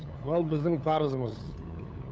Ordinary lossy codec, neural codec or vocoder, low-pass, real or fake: none; codec, 16 kHz, 8 kbps, FunCodec, trained on LibriTTS, 25 frames a second; none; fake